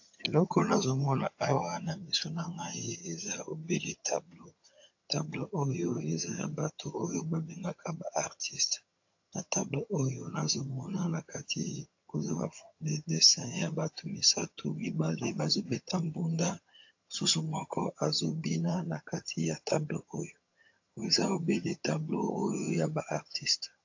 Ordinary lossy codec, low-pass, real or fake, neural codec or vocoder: AAC, 48 kbps; 7.2 kHz; fake; vocoder, 22.05 kHz, 80 mel bands, HiFi-GAN